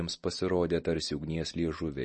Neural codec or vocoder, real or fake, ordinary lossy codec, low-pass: none; real; MP3, 32 kbps; 10.8 kHz